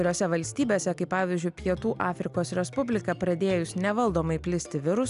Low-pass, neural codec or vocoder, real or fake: 10.8 kHz; none; real